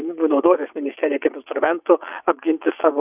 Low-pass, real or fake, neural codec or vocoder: 3.6 kHz; fake; vocoder, 22.05 kHz, 80 mel bands, WaveNeXt